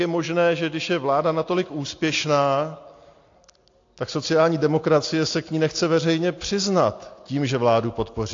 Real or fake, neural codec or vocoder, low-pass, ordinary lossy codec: real; none; 7.2 kHz; AAC, 48 kbps